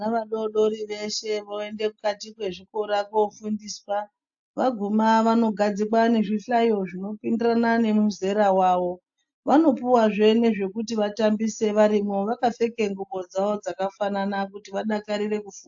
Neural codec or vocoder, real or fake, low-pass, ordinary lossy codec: none; real; 7.2 kHz; AAC, 64 kbps